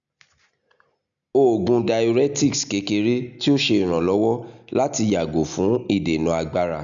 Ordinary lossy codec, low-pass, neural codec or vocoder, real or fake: none; 7.2 kHz; none; real